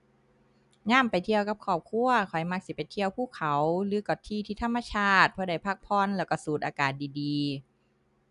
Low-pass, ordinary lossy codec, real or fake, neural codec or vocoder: 10.8 kHz; none; real; none